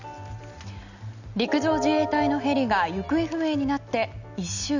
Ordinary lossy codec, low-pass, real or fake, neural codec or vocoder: none; 7.2 kHz; real; none